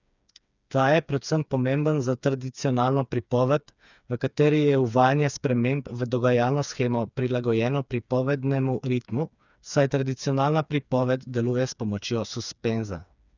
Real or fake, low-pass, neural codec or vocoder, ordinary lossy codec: fake; 7.2 kHz; codec, 16 kHz, 4 kbps, FreqCodec, smaller model; none